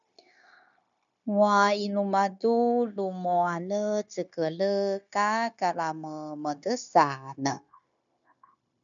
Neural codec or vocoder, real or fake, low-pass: codec, 16 kHz, 0.9 kbps, LongCat-Audio-Codec; fake; 7.2 kHz